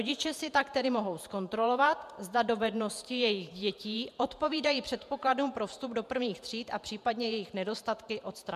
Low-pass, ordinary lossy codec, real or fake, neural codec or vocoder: 14.4 kHz; MP3, 96 kbps; fake; vocoder, 48 kHz, 128 mel bands, Vocos